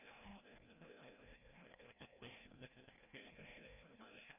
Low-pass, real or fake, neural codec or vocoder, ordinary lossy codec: 3.6 kHz; fake; codec, 16 kHz, 1 kbps, FreqCodec, larger model; none